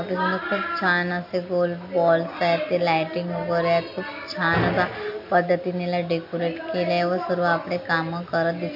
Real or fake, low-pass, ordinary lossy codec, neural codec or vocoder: real; 5.4 kHz; MP3, 32 kbps; none